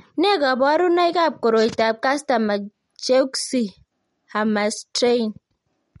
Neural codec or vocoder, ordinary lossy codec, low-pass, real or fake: none; MP3, 48 kbps; 19.8 kHz; real